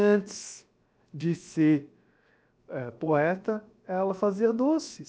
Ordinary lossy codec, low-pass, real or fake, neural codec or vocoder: none; none; fake; codec, 16 kHz, 0.7 kbps, FocalCodec